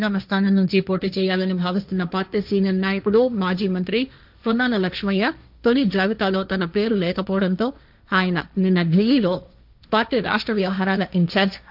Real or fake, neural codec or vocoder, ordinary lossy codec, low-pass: fake; codec, 16 kHz, 1.1 kbps, Voila-Tokenizer; none; 5.4 kHz